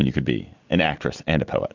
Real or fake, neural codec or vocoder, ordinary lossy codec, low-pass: real; none; MP3, 64 kbps; 7.2 kHz